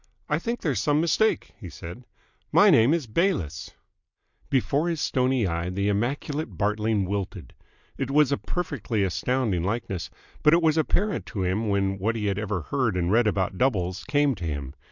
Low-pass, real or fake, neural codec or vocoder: 7.2 kHz; real; none